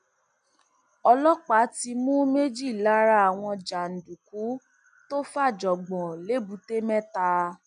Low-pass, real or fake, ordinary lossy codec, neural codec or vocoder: 9.9 kHz; real; none; none